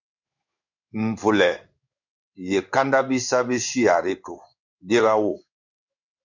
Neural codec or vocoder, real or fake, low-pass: codec, 16 kHz in and 24 kHz out, 1 kbps, XY-Tokenizer; fake; 7.2 kHz